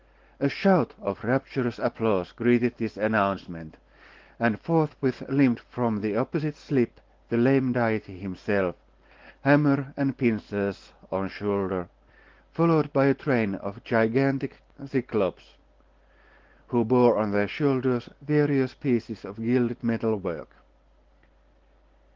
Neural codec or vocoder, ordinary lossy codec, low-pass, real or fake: none; Opus, 16 kbps; 7.2 kHz; real